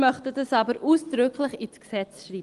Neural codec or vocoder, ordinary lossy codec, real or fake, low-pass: none; Opus, 24 kbps; real; 10.8 kHz